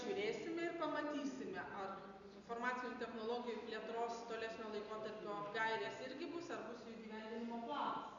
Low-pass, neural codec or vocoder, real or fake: 7.2 kHz; none; real